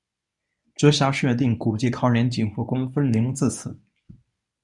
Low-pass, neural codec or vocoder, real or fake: 10.8 kHz; codec, 24 kHz, 0.9 kbps, WavTokenizer, medium speech release version 1; fake